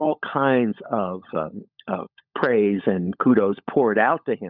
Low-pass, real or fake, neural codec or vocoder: 5.4 kHz; fake; codec, 16 kHz, 16 kbps, FunCodec, trained on LibriTTS, 50 frames a second